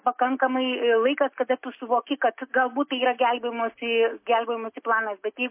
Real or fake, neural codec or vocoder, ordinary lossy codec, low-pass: real; none; MP3, 24 kbps; 3.6 kHz